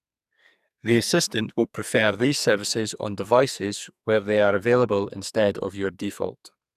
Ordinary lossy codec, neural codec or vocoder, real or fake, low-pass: none; codec, 44.1 kHz, 2.6 kbps, SNAC; fake; 14.4 kHz